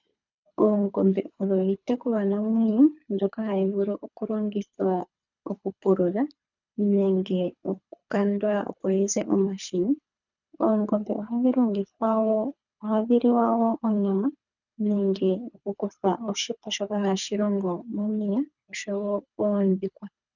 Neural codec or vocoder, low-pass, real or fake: codec, 24 kHz, 3 kbps, HILCodec; 7.2 kHz; fake